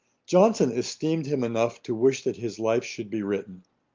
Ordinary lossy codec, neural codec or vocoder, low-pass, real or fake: Opus, 32 kbps; none; 7.2 kHz; real